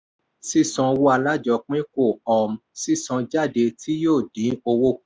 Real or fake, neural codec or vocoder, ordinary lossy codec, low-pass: real; none; none; none